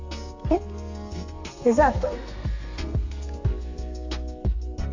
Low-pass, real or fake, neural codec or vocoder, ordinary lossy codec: 7.2 kHz; fake; codec, 32 kHz, 1.9 kbps, SNAC; AAC, 48 kbps